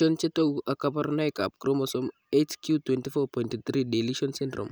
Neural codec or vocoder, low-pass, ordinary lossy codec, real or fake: none; none; none; real